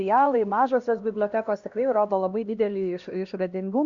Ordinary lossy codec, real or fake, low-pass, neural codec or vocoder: Opus, 64 kbps; fake; 7.2 kHz; codec, 16 kHz, 1 kbps, X-Codec, HuBERT features, trained on LibriSpeech